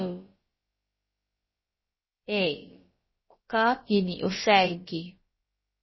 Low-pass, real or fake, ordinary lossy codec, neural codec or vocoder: 7.2 kHz; fake; MP3, 24 kbps; codec, 16 kHz, about 1 kbps, DyCAST, with the encoder's durations